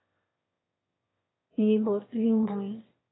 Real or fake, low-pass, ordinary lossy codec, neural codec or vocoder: fake; 7.2 kHz; AAC, 16 kbps; autoencoder, 22.05 kHz, a latent of 192 numbers a frame, VITS, trained on one speaker